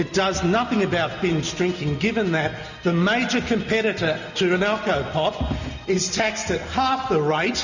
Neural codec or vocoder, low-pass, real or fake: none; 7.2 kHz; real